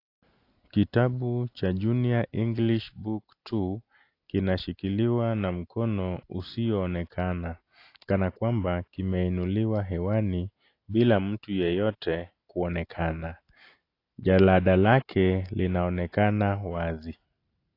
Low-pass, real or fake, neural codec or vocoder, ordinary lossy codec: 5.4 kHz; real; none; AAC, 32 kbps